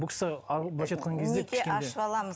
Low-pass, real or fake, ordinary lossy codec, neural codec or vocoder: none; real; none; none